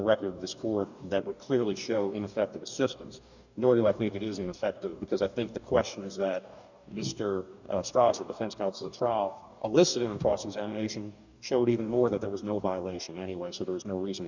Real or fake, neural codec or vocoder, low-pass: fake; codec, 44.1 kHz, 2.6 kbps, DAC; 7.2 kHz